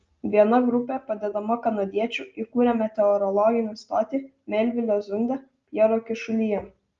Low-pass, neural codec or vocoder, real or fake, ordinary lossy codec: 7.2 kHz; none; real; Opus, 32 kbps